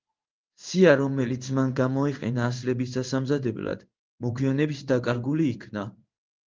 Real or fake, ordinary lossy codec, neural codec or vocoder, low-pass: fake; Opus, 32 kbps; codec, 16 kHz in and 24 kHz out, 1 kbps, XY-Tokenizer; 7.2 kHz